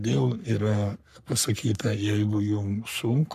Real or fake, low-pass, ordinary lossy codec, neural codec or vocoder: fake; 14.4 kHz; AAC, 96 kbps; codec, 44.1 kHz, 3.4 kbps, Pupu-Codec